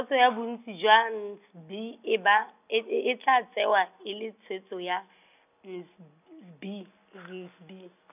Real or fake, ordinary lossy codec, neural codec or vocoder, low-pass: real; none; none; 3.6 kHz